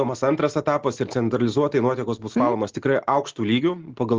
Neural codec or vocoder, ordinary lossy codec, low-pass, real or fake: none; Opus, 16 kbps; 7.2 kHz; real